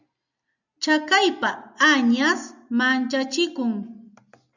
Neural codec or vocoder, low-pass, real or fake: none; 7.2 kHz; real